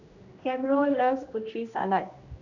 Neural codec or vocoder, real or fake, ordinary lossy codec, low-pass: codec, 16 kHz, 1 kbps, X-Codec, HuBERT features, trained on general audio; fake; none; 7.2 kHz